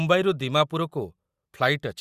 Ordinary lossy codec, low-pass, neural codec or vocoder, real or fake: Opus, 64 kbps; 14.4 kHz; none; real